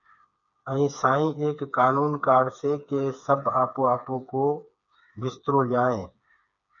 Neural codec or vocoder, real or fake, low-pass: codec, 16 kHz, 4 kbps, FreqCodec, smaller model; fake; 7.2 kHz